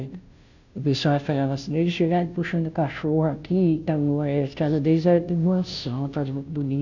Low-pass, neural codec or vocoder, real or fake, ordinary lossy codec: 7.2 kHz; codec, 16 kHz, 0.5 kbps, FunCodec, trained on Chinese and English, 25 frames a second; fake; none